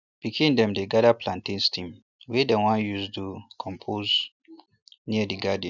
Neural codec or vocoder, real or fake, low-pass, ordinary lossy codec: none; real; 7.2 kHz; none